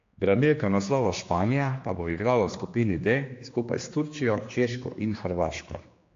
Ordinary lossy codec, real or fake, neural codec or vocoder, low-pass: AAC, 48 kbps; fake; codec, 16 kHz, 2 kbps, X-Codec, HuBERT features, trained on general audio; 7.2 kHz